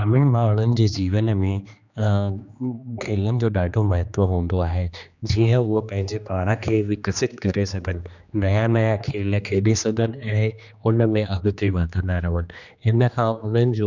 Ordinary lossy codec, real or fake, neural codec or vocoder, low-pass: none; fake; codec, 16 kHz, 2 kbps, X-Codec, HuBERT features, trained on general audio; 7.2 kHz